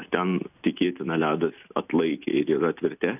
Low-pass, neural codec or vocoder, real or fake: 3.6 kHz; none; real